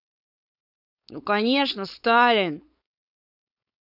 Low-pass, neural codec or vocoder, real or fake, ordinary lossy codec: 5.4 kHz; codec, 16 kHz, 4.8 kbps, FACodec; fake; none